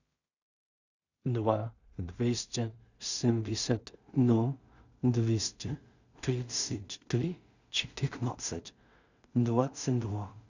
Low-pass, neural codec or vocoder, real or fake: 7.2 kHz; codec, 16 kHz in and 24 kHz out, 0.4 kbps, LongCat-Audio-Codec, two codebook decoder; fake